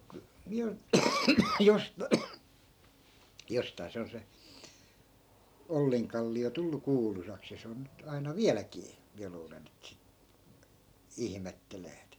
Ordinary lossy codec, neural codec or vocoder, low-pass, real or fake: none; none; none; real